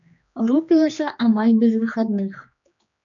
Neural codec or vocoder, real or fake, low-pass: codec, 16 kHz, 2 kbps, X-Codec, HuBERT features, trained on general audio; fake; 7.2 kHz